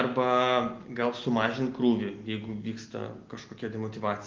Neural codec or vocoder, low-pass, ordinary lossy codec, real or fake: none; 7.2 kHz; Opus, 32 kbps; real